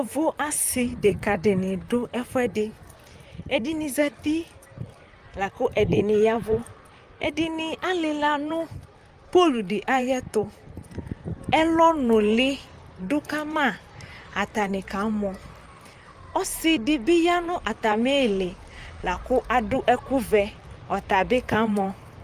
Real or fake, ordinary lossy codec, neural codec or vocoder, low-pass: fake; Opus, 32 kbps; vocoder, 44.1 kHz, 128 mel bands, Pupu-Vocoder; 14.4 kHz